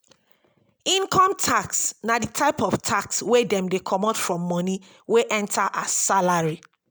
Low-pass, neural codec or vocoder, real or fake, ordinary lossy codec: none; none; real; none